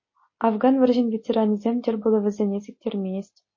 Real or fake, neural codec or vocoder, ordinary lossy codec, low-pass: real; none; MP3, 32 kbps; 7.2 kHz